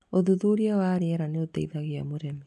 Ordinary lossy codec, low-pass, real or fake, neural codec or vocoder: none; none; real; none